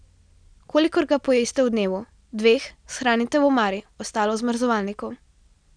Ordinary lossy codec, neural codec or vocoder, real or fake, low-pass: none; none; real; 9.9 kHz